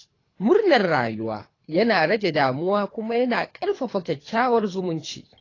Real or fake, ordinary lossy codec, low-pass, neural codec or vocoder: fake; AAC, 32 kbps; 7.2 kHz; codec, 24 kHz, 3 kbps, HILCodec